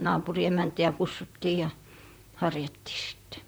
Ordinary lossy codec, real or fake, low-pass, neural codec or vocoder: none; fake; none; vocoder, 44.1 kHz, 128 mel bands, Pupu-Vocoder